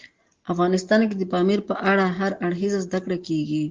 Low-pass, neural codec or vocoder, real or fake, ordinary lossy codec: 7.2 kHz; none; real; Opus, 16 kbps